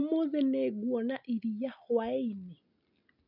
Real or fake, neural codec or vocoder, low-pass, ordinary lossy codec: real; none; 5.4 kHz; none